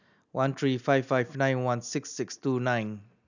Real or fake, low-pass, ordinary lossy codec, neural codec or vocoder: real; 7.2 kHz; none; none